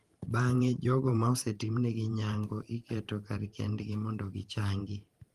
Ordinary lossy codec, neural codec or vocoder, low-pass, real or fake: Opus, 24 kbps; vocoder, 44.1 kHz, 128 mel bands every 512 samples, BigVGAN v2; 14.4 kHz; fake